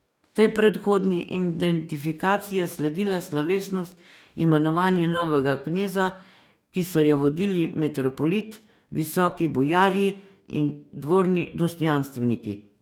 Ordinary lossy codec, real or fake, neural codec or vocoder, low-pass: none; fake; codec, 44.1 kHz, 2.6 kbps, DAC; 19.8 kHz